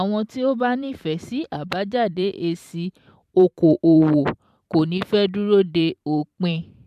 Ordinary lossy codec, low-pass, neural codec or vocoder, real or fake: MP3, 96 kbps; 14.4 kHz; vocoder, 44.1 kHz, 128 mel bands every 512 samples, BigVGAN v2; fake